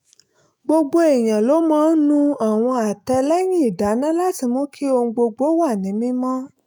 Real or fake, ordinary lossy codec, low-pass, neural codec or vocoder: fake; none; none; autoencoder, 48 kHz, 128 numbers a frame, DAC-VAE, trained on Japanese speech